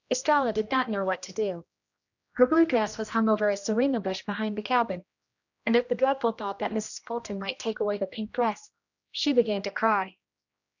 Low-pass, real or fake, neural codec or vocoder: 7.2 kHz; fake; codec, 16 kHz, 1 kbps, X-Codec, HuBERT features, trained on general audio